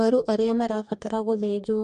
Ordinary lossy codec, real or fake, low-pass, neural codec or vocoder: MP3, 48 kbps; fake; 14.4 kHz; codec, 44.1 kHz, 2.6 kbps, DAC